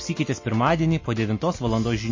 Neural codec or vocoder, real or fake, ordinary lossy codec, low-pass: none; real; MP3, 32 kbps; 7.2 kHz